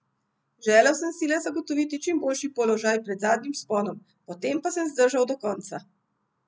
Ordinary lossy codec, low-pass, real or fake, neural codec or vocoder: none; none; real; none